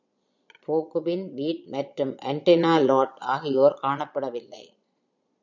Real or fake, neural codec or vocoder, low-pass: fake; vocoder, 22.05 kHz, 80 mel bands, Vocos; 7.2 kHz